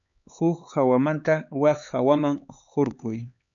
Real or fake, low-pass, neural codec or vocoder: fake; 7.2 kHz; codec, 16 kHz, 4 kbps, X-Codec, HuBERT features, trained on LibriSpeech